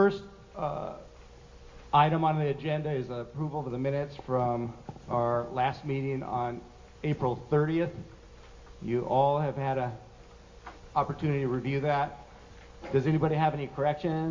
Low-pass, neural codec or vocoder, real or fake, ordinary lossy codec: 7.2 kHz; none; real; MP3, 48 kbps